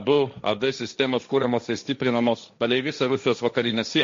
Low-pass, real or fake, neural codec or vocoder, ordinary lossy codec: 7.2 kHz; fake; codec, 16 kHz, 1.1 kbps, Voila-Tokenizer; MP3, 48 kbps